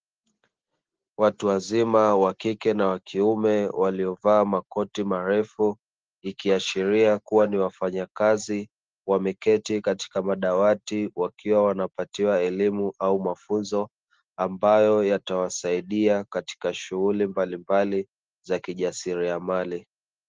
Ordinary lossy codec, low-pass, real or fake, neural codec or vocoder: Opus, 16 kbps; 7.2 kHz; real; none